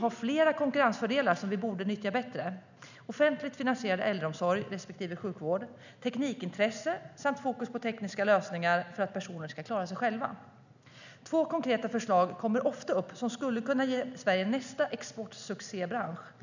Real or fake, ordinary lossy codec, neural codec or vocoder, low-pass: real; none; none; 7.2 kHz